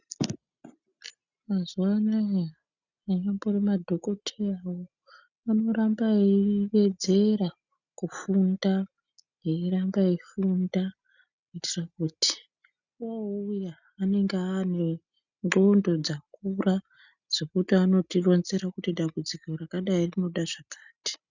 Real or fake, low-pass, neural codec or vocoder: real; 7.2 kHz; none